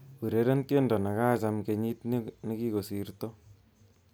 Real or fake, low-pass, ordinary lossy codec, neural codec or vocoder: real; none; none; none